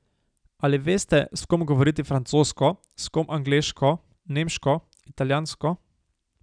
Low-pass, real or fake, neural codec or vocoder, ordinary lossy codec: 9.9 kHz; real; none; none